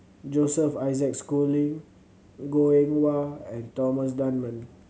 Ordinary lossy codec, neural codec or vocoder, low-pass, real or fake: none; none; none; real